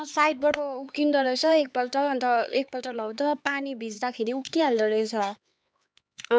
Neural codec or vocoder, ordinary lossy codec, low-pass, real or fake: codec, 16 kHz, 4 kbps, X-Codec, HuBERT features, trained on LibriSpeech; none; none; fake